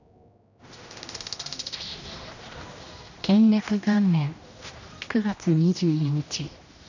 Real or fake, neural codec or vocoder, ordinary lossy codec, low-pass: fake; codec, 16 kHz, 1 kbps, X-Codec, HuBERT features, trained on general audio; none; 7.2 kHz